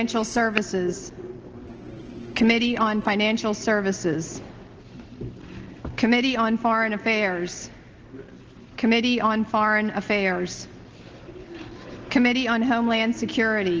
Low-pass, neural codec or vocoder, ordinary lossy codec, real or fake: 7.2 kHz; none; Opus, 16 kbps; real